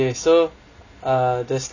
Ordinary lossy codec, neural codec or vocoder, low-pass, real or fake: none; none; 7.2 kHz; real